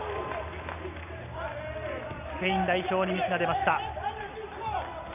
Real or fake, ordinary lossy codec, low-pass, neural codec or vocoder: real; none; 3.6 kHz; none